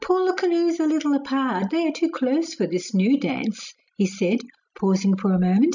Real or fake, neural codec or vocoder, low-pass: real; none; 7.2 kHz